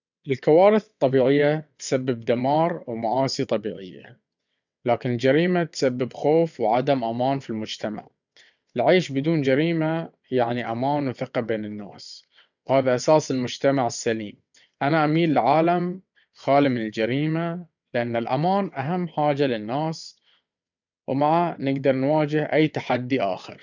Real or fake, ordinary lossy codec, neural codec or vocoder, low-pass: fake; none; vocoder, 22.05 kHz, 80 mel bands, WaveNeXt; 7.2 kHz